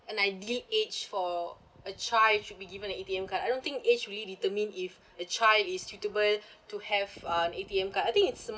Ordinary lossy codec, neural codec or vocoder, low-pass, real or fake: none; none; none; real